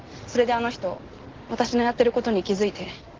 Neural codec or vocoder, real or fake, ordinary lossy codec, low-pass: none; real; Opus, 16 kbps; 7.2 kHz